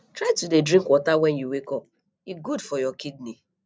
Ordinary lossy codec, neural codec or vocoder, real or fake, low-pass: none; none; real; none